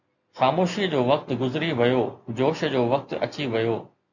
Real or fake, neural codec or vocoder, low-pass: real; none; 7.2 kHz